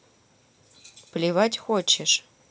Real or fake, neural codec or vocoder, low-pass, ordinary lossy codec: real; none; none; none